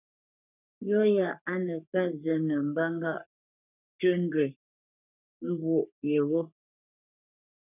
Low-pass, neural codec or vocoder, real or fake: 3.6 kHz; codec, 44.1 kHz, 3.4 kbps, Pupu-Codec; fake